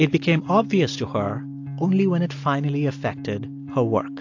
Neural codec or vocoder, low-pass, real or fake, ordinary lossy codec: none; 7.2 kHz; real; AAC, 48 kbps